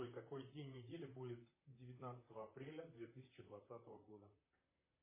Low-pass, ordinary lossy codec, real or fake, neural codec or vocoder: 3.6 kHz; MP3, 16 kbps; fake; vocoder, 44.1 kHz, 128 mel bands, Pupu-Vocoder